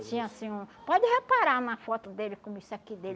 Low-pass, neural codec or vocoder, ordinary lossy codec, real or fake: none; none; none; real